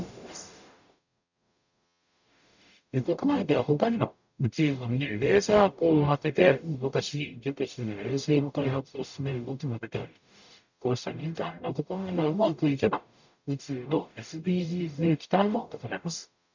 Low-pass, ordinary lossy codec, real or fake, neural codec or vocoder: 7.2 kHz; none; fake; codec, 44.1 kHz, 0.9 kbps, DAC